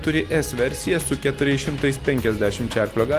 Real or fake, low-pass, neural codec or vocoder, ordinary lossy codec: real; 14.4 kHz; none; Opus, 24 kbps